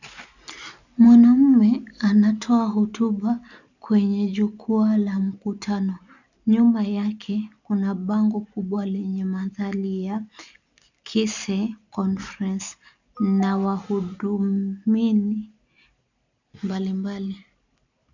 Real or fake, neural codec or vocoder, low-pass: real; none; 7.2 kHz